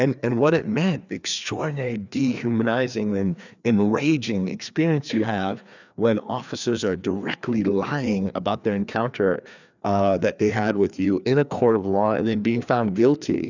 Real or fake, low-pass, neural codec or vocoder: fake; 7.2 kHz; codec, 16 kHz, 2 kbps, FreqCodec, larger model